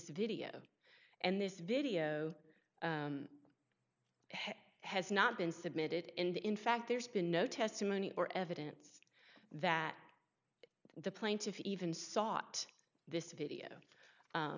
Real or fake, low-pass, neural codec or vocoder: real; 7.2 kHz; none